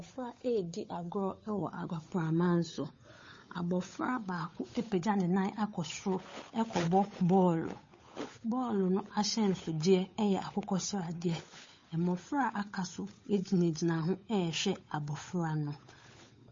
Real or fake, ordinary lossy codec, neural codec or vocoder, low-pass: fake; MP3, 32 kbps; codec, 16 kHz, 8 kbps, FunCodec, trained on Chinese and English, 25 frames a second; 7.2 kHz